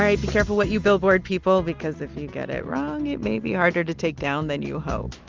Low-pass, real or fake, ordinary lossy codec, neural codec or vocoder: 7.2 kHz; real; Opus, 32 kbps; none